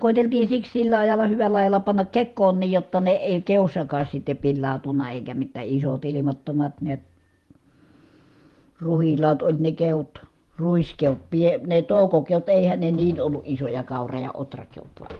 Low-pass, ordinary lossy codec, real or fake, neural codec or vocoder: 14.4 kHz; Opus, 24 kbps; fake; vocoder, 48 kHz, 128 mel bands, Vocos